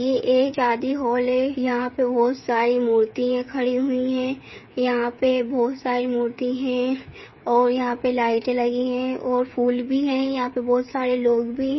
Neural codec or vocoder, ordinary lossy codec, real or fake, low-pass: codec, 16 kHz, 8 kbps, FreqCodec, smaller model; MP3, 24 kbps; fake; 7.2 kHz